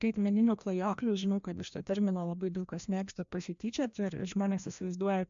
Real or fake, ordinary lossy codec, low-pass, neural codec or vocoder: fake; MP3, 64 kbps; 7.2 kHz; codec, 16 kHz, 1 kbps, FreqCodec, larger model